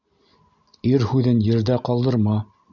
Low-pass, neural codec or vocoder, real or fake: 7.2 kHz; none; real